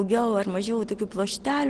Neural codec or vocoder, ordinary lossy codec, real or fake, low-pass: none; Opus, 16 kbps; real; 9.9 kHz